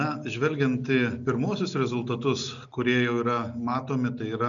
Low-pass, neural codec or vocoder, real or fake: 7.2 kHz; none; real